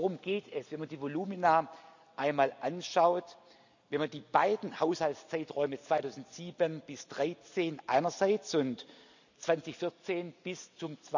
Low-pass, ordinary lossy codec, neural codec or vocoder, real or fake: 7.2 kHz; none; none; real